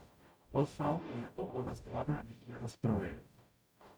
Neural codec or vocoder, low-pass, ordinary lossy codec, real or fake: codec, 44.1 kHz, 0.9 kbps, DAC; none; none; fake